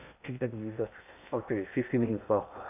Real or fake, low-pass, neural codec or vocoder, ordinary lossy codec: fake; 3.6 kHz; codec, 16 kHz in and 24 kHz out, 0.6 kbps, FocalCodec, streaming, 2048 codes; none